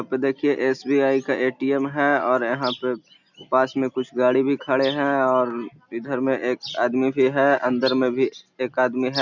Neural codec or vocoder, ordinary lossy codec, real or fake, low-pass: none; none; real; 7.2 kHz